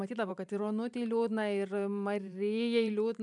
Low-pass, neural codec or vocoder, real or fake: 10.8 kHz; vocoder, 44.1 kHz, 128 mel bands every 512 samples, BigVGAN v2; fake